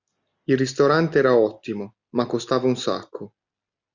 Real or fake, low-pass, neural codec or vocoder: real; 7.2 kHz; none